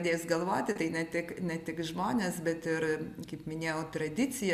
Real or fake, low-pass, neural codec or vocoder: real; 14.4 kHz; none